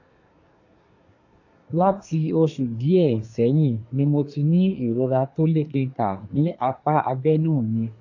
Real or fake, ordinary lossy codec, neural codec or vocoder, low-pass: fake; AAC, 48 kbps; codec, 24 kHz, 1 kbps, SNAC; 7.2 kHz